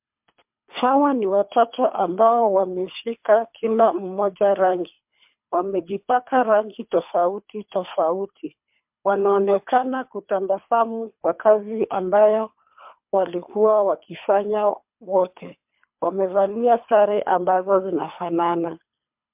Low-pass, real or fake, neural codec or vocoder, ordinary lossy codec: 3.6 kHz; fake; codec, 24 kHz, 3 kbps, HILCodec; MP3, 32 kbps